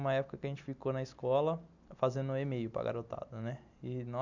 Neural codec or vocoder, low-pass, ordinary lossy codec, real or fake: none; 7.2 kHz; none; real